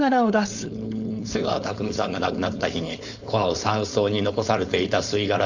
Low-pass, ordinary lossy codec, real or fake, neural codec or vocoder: 7.2 kHz; Opus, 64 kbps; fake; codec, 16 kHz, 4.8 kbps, FACodec